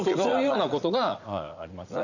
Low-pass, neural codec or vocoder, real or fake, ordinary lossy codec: 7.2 kHz; vocoder, 44.1 kHz, 80 mel bands, Vocos; fake; none